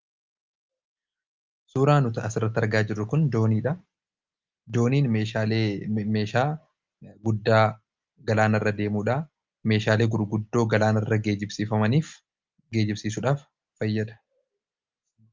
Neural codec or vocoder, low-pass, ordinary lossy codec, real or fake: none; 7.2 kHz; Opus, 24 kbps; real